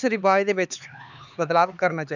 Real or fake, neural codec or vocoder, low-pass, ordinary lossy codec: fake; codec, 16 kHz, 4 kbps, X-Codec, HuBERT features, trained on LibriSpeech; 7.2 kHz; none